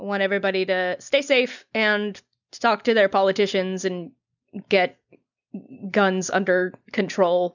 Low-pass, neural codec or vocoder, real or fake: 7.2 kHz; none; real